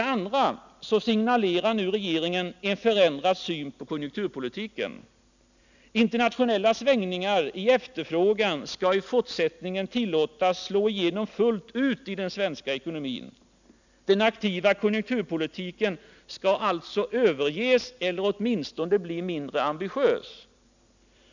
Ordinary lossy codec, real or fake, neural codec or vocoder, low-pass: none; real; none; 7.2 kHz